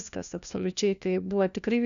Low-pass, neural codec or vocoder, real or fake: 7.2 kHz; codec, 16 kHz, 1 kbps, FunCodec, trained on LibriTTS, 50 frames a second; fake